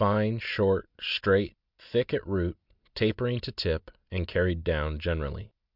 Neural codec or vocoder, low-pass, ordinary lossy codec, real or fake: none; 5.4 kHz; Opus, 64 kbps; real